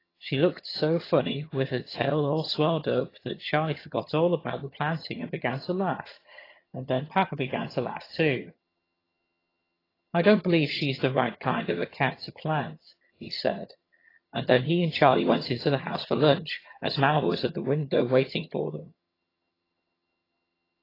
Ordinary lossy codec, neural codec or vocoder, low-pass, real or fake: AAC, 24 kbps; vocoder, 22.05 kHz, 80 mel bands, HiFi-GAN; 5.4 kHz; fake